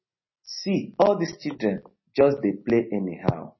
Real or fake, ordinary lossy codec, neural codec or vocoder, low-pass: real; MP3, 24 kbps; none; 7.2 kHz